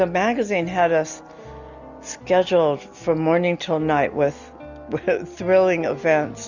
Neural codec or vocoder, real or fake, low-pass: none; real; 7.2 kHz